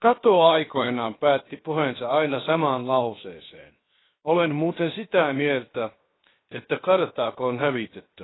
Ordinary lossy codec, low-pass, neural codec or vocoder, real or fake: AAC, 16 kbps; 7.2 kHz; codec, 16 kHz, 0.7 kbps, FocalCodec; fake